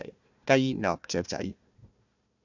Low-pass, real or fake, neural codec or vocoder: 7.2 kHz; fake; codec, 16 kHz, 1 kbps, FunCodec, trained on Chinese and English, 50 frames a second